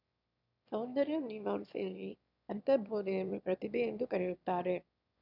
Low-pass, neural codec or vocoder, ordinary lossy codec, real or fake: 5.4 kHz; autoencoder, 22.05 kHz, a latent of 192 numbers a frame, VITS, trained on one speaker; none; fake